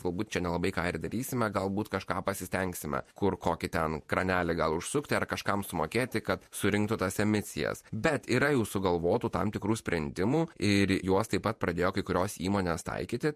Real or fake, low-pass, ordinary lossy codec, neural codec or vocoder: fake; 14.4 kHz; MP3, 64 kbps; vocoder, 48 kHz, 128 mel bands, Vocos